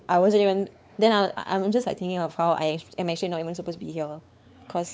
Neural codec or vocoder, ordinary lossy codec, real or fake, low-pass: codec, 16 kHz, 4 kbps, X-Codec, WavLM features, trained on Multilingual LibriSpeech; none; fake; none